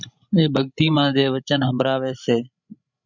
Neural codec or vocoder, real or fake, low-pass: codec, 16 kHz, 16 kbps, FreqCodec, larger model; fake; 7.2 kHz